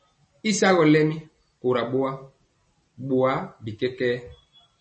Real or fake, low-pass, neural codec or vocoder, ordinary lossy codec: real; 9.9 kHz; none; MP3, 32 kbps